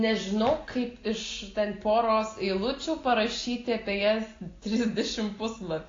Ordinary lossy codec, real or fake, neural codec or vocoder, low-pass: AAC, 32 kbps; real; none; 7.2 kHz